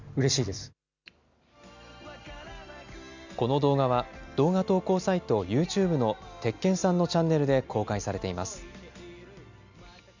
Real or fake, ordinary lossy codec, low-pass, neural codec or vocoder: real; none; 7.2 kHz; none